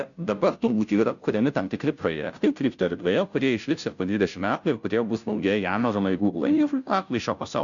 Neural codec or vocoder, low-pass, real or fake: codec, 16 kHz, 0.5 kbps, FunCodec, trained on Chinese and English, 25 frames a second; 7.2 kHz; fake